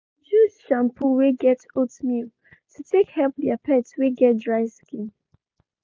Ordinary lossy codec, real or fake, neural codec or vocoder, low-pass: none; real; none; none